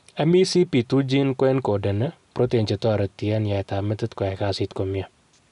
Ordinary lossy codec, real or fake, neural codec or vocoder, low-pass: none; real; none; 10.8 kHz